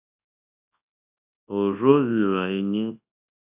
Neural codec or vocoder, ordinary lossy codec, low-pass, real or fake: codec, 24 kHz, 0.9 kbps, WavTokenizer, large speech release; AAC, 32 kbps; 3.6 kHz; fake